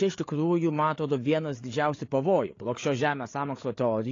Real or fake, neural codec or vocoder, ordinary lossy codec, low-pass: fake; codec, 16 kHz, 8 kbps, FreqCodec, larger model; AAC, 32 kbps; 7.2 kHz